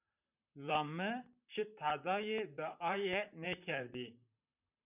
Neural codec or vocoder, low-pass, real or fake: vocoder, 44.1 kHz, 128 mel bands, Pupu-Vocoder; 3.6 kHz; fake